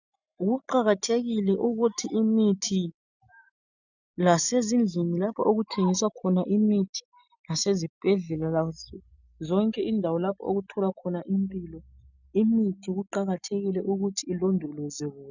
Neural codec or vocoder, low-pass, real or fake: none; 7.2 kHz; real